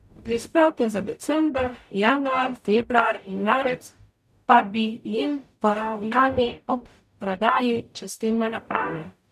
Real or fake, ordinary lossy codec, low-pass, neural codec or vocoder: fake; none; 14.4 kHz; codec, 44.1 kHz, 0.9 kbps, DAC